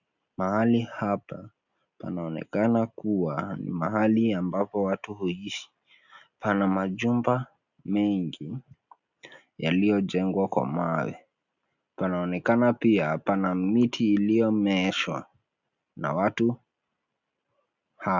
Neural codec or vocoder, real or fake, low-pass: none; real; 7.2 kHz